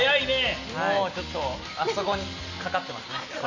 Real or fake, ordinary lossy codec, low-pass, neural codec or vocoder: real; none; 7.2 kHz; none